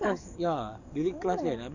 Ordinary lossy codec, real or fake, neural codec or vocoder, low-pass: none; fake; codec, 44.1 kHz, 7.8 kbps, DAC; 7.2 kHz